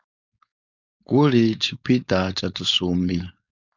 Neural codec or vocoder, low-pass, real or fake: codec, 16 kHz, 4.8 kbps, FACodec; 7.2 kHz; fake